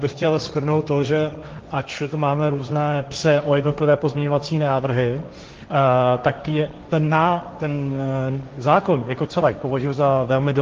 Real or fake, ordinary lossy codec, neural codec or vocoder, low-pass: fake; Opus, 32 kbps; codec, 16 kHz, 1.1 kbps, Voila-Tokenizer; 7.2 kHz